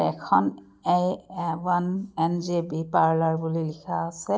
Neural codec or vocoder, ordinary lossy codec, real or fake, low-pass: none; none; real; none